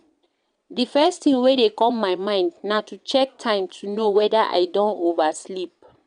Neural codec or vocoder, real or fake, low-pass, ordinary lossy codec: vocoder, 22.05 kHz, 80 mel bands, Vocos; fake; 9.9 kHz; none